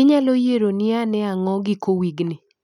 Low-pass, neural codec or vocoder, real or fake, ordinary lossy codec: 19.8 kHz; none; real; none